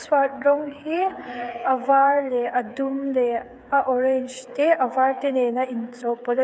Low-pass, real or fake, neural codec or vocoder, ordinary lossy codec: none; fake; codec, 16 kHz, 8 kbps, FreqCodec, smaller model; none